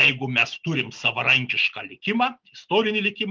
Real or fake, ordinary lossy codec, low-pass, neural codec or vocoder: real; Opus, 32 kbps; 7.2 kHz; none